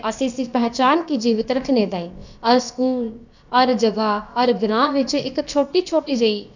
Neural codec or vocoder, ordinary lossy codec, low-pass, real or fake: codec, 16 kHz, about 1 kbps, DyCAST, with the encoder's durations; none; 7.2 kHz; fake